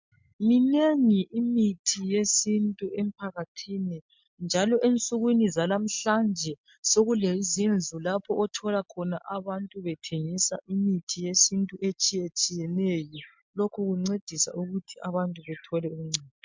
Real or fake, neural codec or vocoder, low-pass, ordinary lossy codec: real; none; 7.2 kHz; MP3, 64 kbps